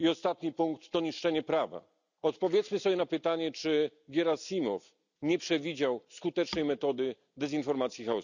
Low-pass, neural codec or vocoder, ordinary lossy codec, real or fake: 7.2 kHz; none; none; real